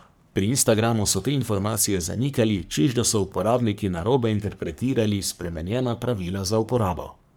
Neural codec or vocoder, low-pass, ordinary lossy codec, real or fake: codec, 44.1 kHz, 3.4 kbps, Pupu-Codec; none; none; fake